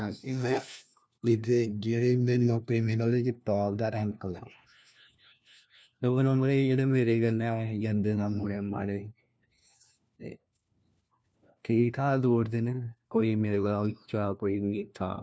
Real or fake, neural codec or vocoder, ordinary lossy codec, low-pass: fake; codec, 16 kHz, 1 kbps, FunCodec, trained on LibriTTS, 50 frames a second; none; none